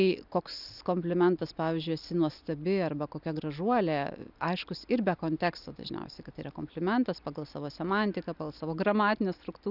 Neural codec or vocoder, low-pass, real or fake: none; 5.4 kHz; real